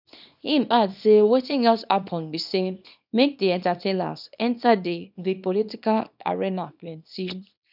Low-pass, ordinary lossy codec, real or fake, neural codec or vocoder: 5.4 kHz; none; fake; codec, 24 kHz, 0.9 kbps, WavTokenizer, small release